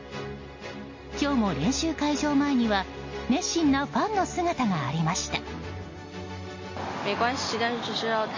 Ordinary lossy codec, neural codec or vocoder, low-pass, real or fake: MP3, 32 kbps; none; 7.2 kHz; real